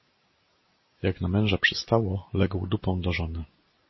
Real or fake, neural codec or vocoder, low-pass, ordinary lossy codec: real; none; 7.2 kHz; MP3, 24 kbps